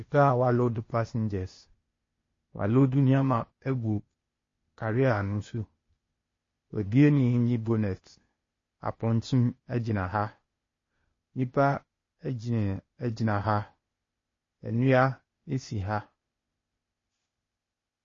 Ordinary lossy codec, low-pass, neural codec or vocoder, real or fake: MP3, 32 kbps; 7.2 kHz; codec, 16 kHz, 0.8 kbps, ZipCodec; fake